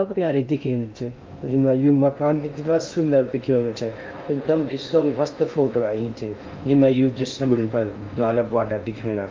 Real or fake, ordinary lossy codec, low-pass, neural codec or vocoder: fake; Opus, 24 kbps; 7.2 kHz; codec, 16 kHz in and 24 kHz out, 0.6 kbps, FocalCodec, streaming, 2048 codes